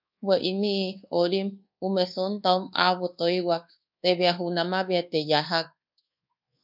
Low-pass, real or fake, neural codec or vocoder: 5.4 kHz; fake; codec, 24 kHz, 1.2 kbps, DualCodec